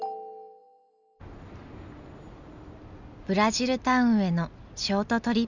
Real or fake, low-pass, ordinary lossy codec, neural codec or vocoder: real; 7.2 kHz; none; none